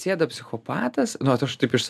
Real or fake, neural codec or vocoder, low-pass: real; none; 14.4 kHz